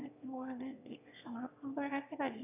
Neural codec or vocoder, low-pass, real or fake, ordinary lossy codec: autoencoder, 22.05 kHz, a latent of 192 numbers a frame, VITS, trained on one speaker; 3.6 kHz; fake; none